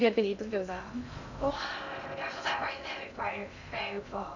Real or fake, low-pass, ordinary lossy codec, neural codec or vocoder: fake; 7.2 kHz; none; codec, 16 kHz in and 24 kHz out, 0.6 kbps, FocalCodec, streaming, 2048 codes